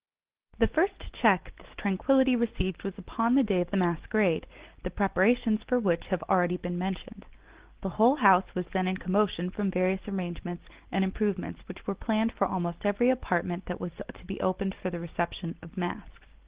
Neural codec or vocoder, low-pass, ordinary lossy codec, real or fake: none; 3.6 kHz; Opus, 16 kbps; real